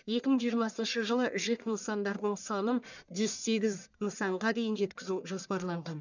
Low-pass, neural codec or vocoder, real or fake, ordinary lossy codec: 7.2 kHz; codec, 44.1 kHz, 1.7 kbps, Pupu-Codec; fake; none